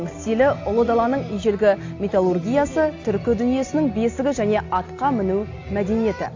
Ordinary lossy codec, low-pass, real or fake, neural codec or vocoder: AAC, 48 kbps; 7.2 kHz; real; none